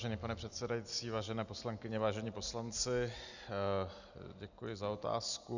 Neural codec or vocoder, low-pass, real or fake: none; 7.2 kHz; real